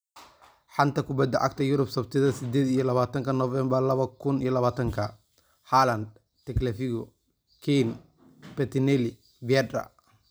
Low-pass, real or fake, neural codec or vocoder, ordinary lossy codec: none; real; none; none